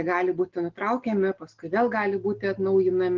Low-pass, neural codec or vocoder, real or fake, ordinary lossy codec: 7.2 kHz; none; real; Opus, 16 kbps